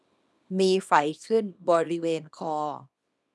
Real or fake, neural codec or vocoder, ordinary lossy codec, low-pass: fake; codec, 24 kHz, 0.9 kbps, WavTokenizer, small release; none; none